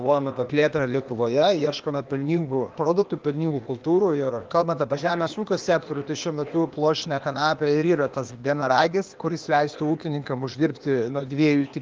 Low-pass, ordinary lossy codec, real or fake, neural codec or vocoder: 7.2 kHz; Opus, 32 kbps; fake; codec, 16 kHz, 0.8 kbps, ZipCodec